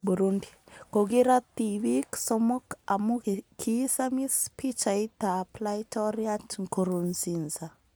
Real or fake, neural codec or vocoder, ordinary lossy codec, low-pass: real; none; none; none